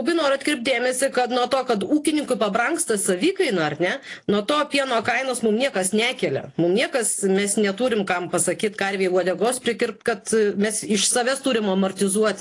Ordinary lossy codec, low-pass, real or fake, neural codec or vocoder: AAC, 48 kbps; 10.8 kHz; real; none